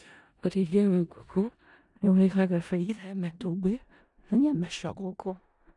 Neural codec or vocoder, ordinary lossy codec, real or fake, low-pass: codec, 16 kHz in and 24 kHz out, 0.4 kbps, LongCat-Audio-Codec, four codebook decoder; none; fake; 10.8 kHz